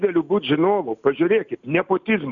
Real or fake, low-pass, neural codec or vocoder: real; 7.2 kHz; none